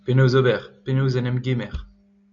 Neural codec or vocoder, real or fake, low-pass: none; real; 7.2 kHz